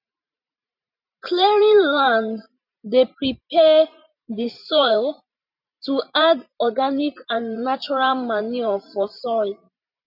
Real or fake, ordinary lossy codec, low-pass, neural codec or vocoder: fake; none; 5.4 kHz; vocoder, 44.1 kHz, 128 mel bands every 512 samples, BigVGAN v2